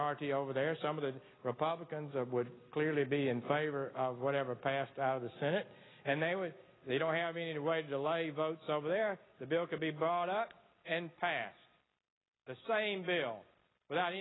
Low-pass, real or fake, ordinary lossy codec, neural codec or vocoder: 7.2 kHz; real; AAC, 16 kbps; none